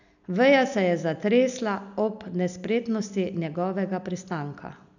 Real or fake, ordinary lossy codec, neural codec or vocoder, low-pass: real; none; none; 7.2 kHz